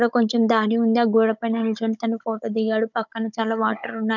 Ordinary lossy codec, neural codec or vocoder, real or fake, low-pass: none; codec, 16 kHz, 16 kbps, FunCodec, trained on Chinese and English, 50 frames a second; fake; 7.2 kHz